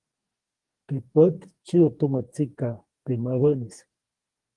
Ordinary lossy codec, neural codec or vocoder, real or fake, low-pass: Opus, 24 kbps; codec, 44.1 kHz, 2.6 kbps, DAC; fake; 10.8 kHz